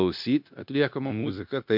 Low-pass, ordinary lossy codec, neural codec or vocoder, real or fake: 5.4 kHz; MP3, 48 kbps; codec, 16 kHz, 0.9 kbps, LongCat-Audio-Codec; fake